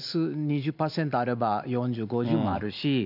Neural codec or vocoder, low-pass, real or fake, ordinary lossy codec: none; 5.4 kHz; real; none